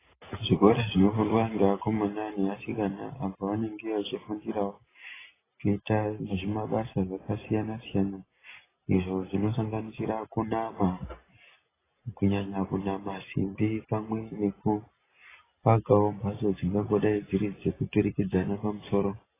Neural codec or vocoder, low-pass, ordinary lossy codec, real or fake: none; 3.6 kHz; AAC, 16 kbps; real